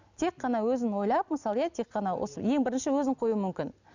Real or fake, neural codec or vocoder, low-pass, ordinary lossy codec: real; none; 7.2 kHz; none